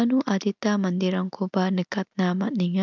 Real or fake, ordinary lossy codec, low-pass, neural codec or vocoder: real; none; 7.2 kHz; none